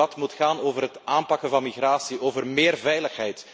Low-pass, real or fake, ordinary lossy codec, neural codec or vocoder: none; real; none; none